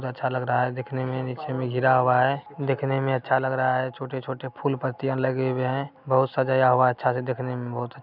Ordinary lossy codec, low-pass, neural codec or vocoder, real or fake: none; 5.4 kHz; none; real